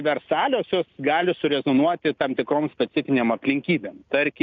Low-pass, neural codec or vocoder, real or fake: 7.2 kHz; none; real